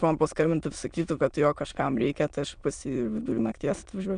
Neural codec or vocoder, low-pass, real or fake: autoencoder, 22.05 kHz, a latent of 192 numbers a frame, VITS, trained on many speakers; 9.9 kHz; fake